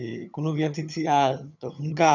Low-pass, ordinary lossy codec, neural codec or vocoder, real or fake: 7.2 kHz; none; vocoder, 22.05 kHz, 80 mel bands, HiFi-GAN; fake